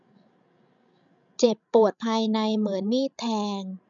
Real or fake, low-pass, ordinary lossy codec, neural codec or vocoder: fake; 7.2 kHz; none; codec, 16 kHz, 16 kbps, FreqCodec, larger model